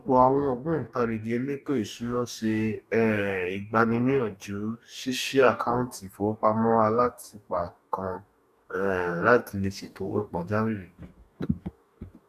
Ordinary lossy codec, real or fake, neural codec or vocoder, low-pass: none; fake; codec, 44.1 kHz, 2.6 kbps, DAC; 14.4 kHz